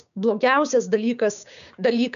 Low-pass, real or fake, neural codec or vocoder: 7.2 kHz; fake; codec, 16 kHz, 6 kbps, DAC